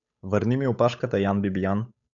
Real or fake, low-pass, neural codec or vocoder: fake; 7.2 kHz; codec, 16 kHz, 8 kbps, FunCodec, trained on Chinese and English, 25 frames a second